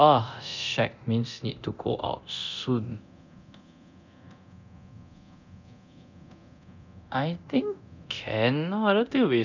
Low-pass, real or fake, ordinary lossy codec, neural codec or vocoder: 7.2 kHz; fake; none; codec, 24 kHz, 0.9 kbps, DualCodec